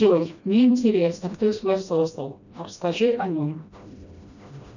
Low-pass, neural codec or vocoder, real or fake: 7.2 kHz; codec, 16 kHz, 1 kbps, FreqCodec, smaller model; fake